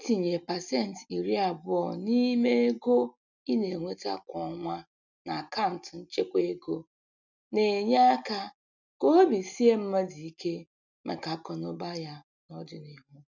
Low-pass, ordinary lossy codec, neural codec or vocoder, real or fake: 7.2 kHz; none; none; real